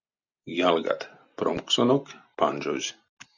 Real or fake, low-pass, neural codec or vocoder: real; 7.2 kHz; none